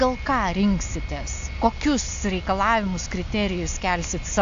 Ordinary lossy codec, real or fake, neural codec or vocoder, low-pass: AAC, 64 kbps; real; none; 7.2 kHz